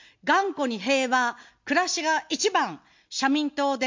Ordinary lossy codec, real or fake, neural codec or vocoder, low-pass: MP3, 48 kbps; real; none; 7.2 kHz